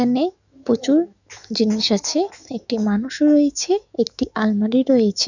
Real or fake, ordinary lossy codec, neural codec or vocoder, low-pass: fake; none; autoencoder, 48 kHz, 128 numbers a frame, DAC-VAE, trained on Japanese speech; 7.2 kHz